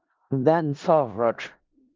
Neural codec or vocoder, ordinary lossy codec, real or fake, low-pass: codec, 16 kHz in and 24 kHz out, 0.4 kbps, LongCat-Audio-Codec, four codebook decoder; Opus, 24 kbps; fake; 7.2 kHz